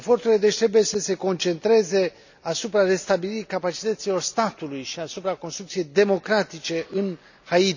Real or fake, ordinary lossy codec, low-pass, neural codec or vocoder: real; none; 7.2 kHz; none